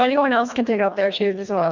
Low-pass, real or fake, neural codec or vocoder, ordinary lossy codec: 7.2 kHz; fake; codec, 24 kHz, 1.5 kbps, HILCodec; MP3, 64 kbps